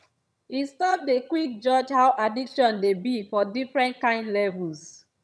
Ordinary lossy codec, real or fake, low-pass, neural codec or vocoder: none; fake; none; vocoder, 22.05 kHz, 80 mel bands, HiFi-GAN